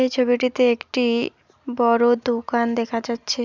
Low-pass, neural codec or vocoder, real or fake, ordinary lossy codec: 7.2 kHz; none; real; none